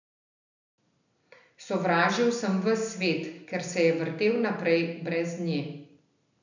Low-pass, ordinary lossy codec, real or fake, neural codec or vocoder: 7.2 kHz; none; real; none